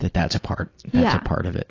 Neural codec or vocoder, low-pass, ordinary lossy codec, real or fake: none; 7.2 kHz; AAC, 32 kbps; real